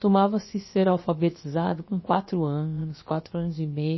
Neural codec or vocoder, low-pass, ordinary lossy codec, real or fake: codec, 16 kHz, about 1 kbps, DyCAST, with the encoder's durations; 7.2 kHz; MP3, 24 kbps; fake